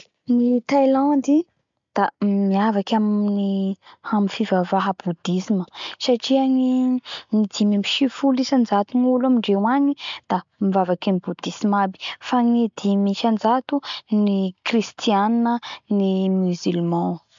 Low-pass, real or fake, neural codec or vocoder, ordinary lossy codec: 7.2 kHz; real; none; MP3, 64 kbps